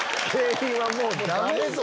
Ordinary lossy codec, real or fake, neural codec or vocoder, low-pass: none; real; none; none